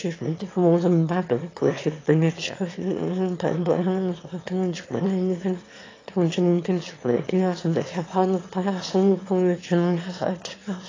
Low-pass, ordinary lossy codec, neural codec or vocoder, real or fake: 7.2 kHz; AAC, 32 kbps; autoencoder, 22.05 kHz, a latent of 192 numbers a frame, VITS, trained on one speaker; fake